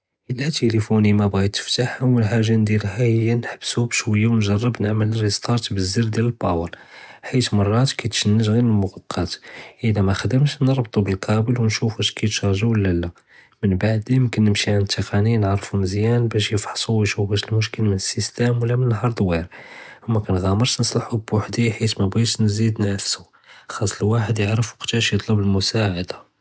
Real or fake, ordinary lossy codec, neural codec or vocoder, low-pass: real; none; none; none